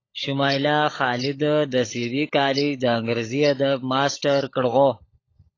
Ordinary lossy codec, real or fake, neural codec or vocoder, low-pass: AAC, 32 kbps; fake; codec, 16 kHz, 16 kbps, FunCodec, trained on LibriTTS, 50 frames a second; 7.2 kHz